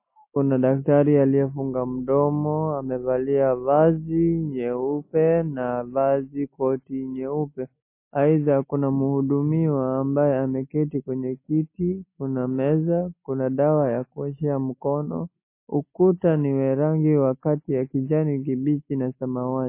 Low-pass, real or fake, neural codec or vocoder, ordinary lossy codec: 3.6 kHz; real; none; MP3, 24 kbps